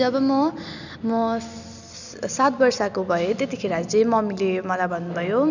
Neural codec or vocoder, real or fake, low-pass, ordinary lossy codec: none; real; 7.2 kHz; none